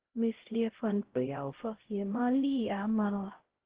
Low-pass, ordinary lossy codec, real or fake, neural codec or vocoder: 3.6 kHz; Opus, 16 kbps; fake; codec, 16 kHz, 0.5 kbps, X-Codec, HuBERT features, trained on LibriSpeech